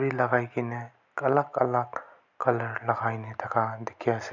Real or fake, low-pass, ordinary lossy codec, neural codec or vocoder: real; 7.2 kHz; none; none